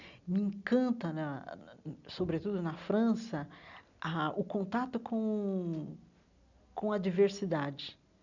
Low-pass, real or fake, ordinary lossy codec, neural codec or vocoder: 7.2 kHz; real; none; none